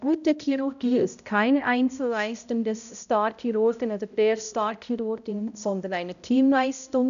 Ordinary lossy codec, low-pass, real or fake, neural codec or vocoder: none; 7.2 kHz; fake; codec, 16 kHz, 0.5 kbps, X-Codec, HuBERT features, trained on balanced general audio